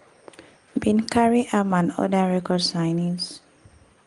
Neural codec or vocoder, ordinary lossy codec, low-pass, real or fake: none; Opus, 32 kbps; 10.8 kHz; real